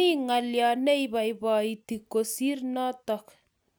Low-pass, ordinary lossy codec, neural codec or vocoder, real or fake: none; none; none; real